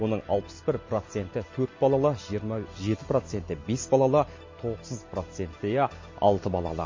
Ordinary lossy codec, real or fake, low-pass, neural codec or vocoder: MP3, 32 kbps; real; 7.2 kHz; none